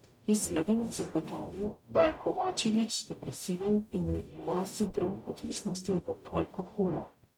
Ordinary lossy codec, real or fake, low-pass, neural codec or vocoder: none; fake; 19.8 kHz; codec, 44.1 kHz, 0.9 kbps, DAC